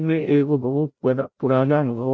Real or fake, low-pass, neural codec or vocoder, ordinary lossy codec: fake; none; codec, 16 kHz, 0.5 kbps, FreqCodec, larger model; none